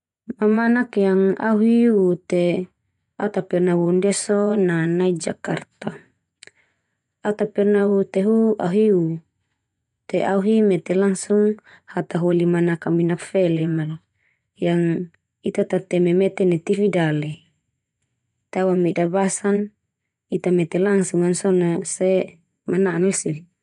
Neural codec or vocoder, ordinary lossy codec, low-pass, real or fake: vocoder, 24 kHz, 100 mel bands, Vocos; none; 10.8 kHz; fake